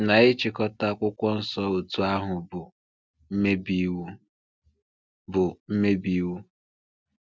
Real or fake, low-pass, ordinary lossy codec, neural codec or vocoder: real; none; none; none